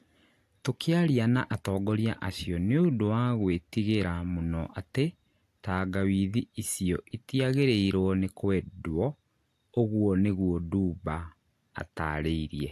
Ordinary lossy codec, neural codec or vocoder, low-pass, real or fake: AAC, 64 kbps; none; 14.4 kHz; real